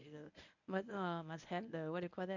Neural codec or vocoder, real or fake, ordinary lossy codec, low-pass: codec, 24 kHz, 0.9 kbps, WavTokenizer, medium speech release version 1; fake; none; 7.2 kHz